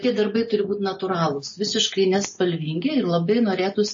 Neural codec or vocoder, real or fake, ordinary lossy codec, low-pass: none; real; MP3, 32 kbps; 7.2 kHz